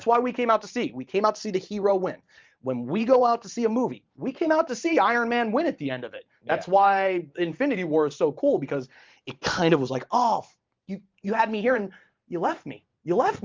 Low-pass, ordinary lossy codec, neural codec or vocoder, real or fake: 7.2 kHz; Opus, 24 kbps; vocoder, 44.1 kHz, 128 mel bands every 512 samples, BigVGAN v2; fake